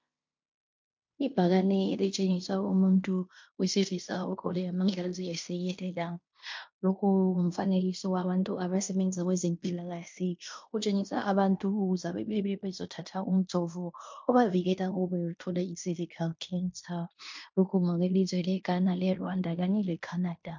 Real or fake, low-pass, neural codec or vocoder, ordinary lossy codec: fake; 7.2 kHz; codec, 16 kHz in and 24 kHz out, 0.9 kbps, LongCat-Audio-Codec, fine tuned four codebook decoder; MP3, 48 kbps